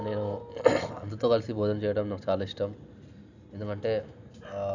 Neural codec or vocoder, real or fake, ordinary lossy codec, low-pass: none; real; none; 7.2 kHz